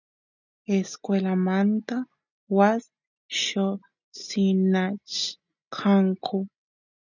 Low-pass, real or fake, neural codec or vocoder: 7.2 kHz; real; none